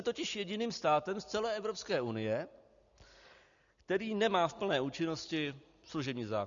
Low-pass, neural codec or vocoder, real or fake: 7.2 kHz; none; real